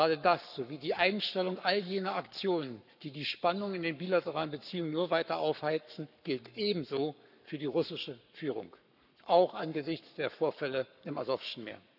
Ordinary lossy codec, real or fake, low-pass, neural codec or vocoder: none; fake; 5.4 kHz; codec, 44.1 kHz, 7.8 kbps, Pupu-Codec